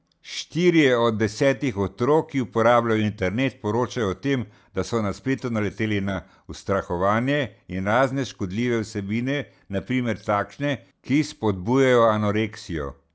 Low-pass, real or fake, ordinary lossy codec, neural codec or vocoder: none; real; none; none